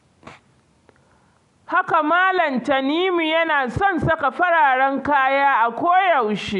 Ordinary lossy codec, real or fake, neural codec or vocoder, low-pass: none; real; none; 10.8 kHz